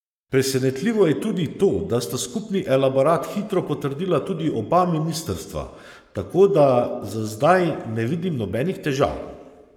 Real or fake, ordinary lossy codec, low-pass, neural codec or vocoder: fake; none; 19.8 kHz; codec, 44.1 kHz, 7.8 kbps, Pupu-Codec